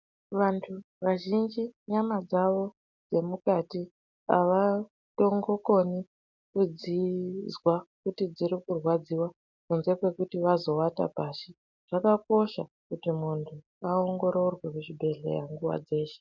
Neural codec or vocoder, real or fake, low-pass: none; real; 7.2 kHz